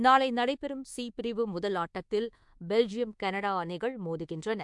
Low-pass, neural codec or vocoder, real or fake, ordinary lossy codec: 10.8 kHz; codec, 24 kHz, 1.2 kbps, DualCodec; fake; MP3, 64 kbps